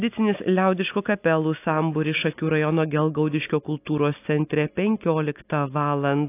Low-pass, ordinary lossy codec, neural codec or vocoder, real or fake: 3.6 kHz; AAC, 24 kbps; none; real